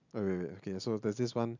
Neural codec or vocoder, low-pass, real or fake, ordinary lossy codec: none; 7.2 kHz; real; none